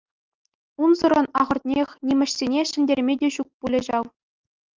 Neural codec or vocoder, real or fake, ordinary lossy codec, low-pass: none; real; Opus, 32 kbps; 7.2 kHz